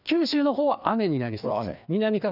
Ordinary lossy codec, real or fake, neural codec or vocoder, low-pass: none; fake; codec, 16 kHz, 2 kbps, FreqCodec, larger model; 5.4 kHz